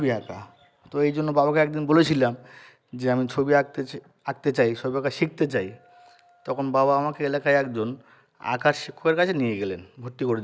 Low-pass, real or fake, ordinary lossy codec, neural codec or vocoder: none; real; none; none